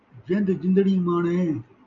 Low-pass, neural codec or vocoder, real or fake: 7.2 kHz; none; real